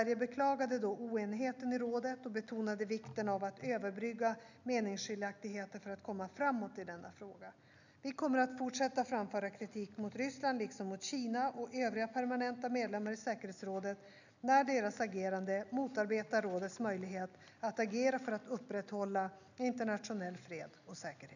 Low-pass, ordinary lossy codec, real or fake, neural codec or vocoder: 7.2 kHz; none; real; none